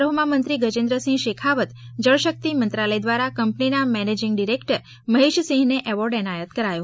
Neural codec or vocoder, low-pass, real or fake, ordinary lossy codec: none; none; real; none